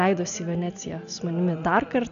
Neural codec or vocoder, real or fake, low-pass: none; real; 7.2 kHz